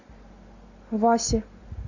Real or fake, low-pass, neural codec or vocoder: real; 7.2 kHz; none